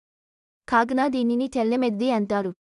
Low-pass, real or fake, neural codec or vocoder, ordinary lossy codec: 10.8 kHz; fake; codec, 16 kHz in and 24 kHz out, 0.4 kbps, LongCat-Audio-Codec, two codebook decoder; none